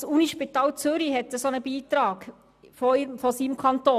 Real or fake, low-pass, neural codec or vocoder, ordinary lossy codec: real; 14.4 kHz; none; none